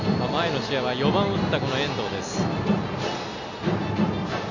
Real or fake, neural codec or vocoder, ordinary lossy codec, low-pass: real; none; none; 7.2 kHz